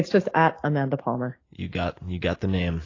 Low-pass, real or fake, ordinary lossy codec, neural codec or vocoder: 7.2 kHz; real; AAC, 32 kbps; none